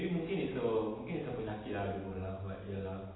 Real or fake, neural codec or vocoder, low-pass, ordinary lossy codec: real; none; 7.2 kHz; AAC, 16 kbps